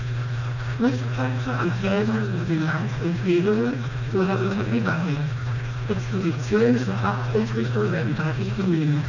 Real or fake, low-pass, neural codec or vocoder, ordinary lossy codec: fake; 7.2 kHz; codec, 16 kHz, 1 kbps, FreqCodec, smaller model; none